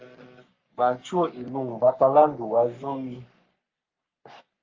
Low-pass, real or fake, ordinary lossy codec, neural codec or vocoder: 7.2 kHz; fake; Opus, 32 kbps; codec, 44.1 kHz, 3.4 kbps, Pupu-Codec